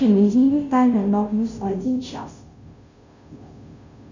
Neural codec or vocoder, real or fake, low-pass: codec, 16 kHz, 0.5 kbps, FunCodec, trained on Chinese and English, 25 frames a second; fake; 7.2 kHz